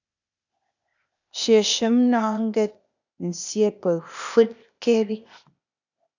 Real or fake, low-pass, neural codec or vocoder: fake; 7.2 kHz; codec, 16 kHz, 0.8 kbps, ZipCodec